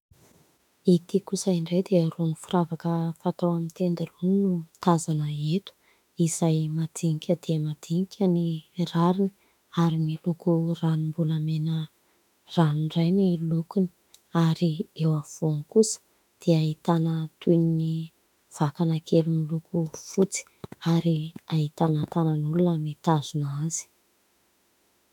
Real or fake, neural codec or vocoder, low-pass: fake; autoencoder, 48 kHz, 32 numbers a frame, DAC-VAE, trained on Japanese speech; 19.8 kHz